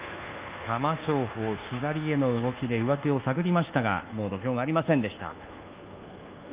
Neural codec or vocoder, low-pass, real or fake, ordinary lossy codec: codec, 24 kHz, 1.2 kbps, DualCodec; 3.6 kHz; fake; Opus, 32 kbps